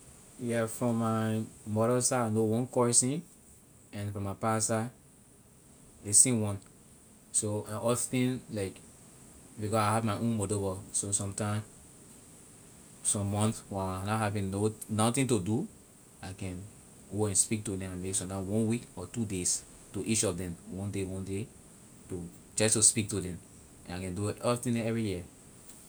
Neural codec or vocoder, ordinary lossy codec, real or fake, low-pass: none; none; real; none